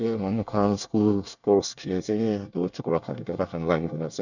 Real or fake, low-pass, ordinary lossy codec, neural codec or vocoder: fake; 7.2 kHz; none; codec, 24 kHz, 1 kbps, SNAC